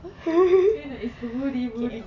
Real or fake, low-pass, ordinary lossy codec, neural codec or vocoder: real; 7.2 kHz; none; none